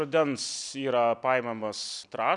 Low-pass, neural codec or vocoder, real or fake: 10.8 kHz; none; real